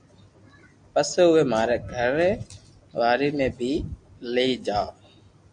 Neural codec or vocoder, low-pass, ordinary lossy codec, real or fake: none; 9.9 kHz; AAC, 64 kbps; real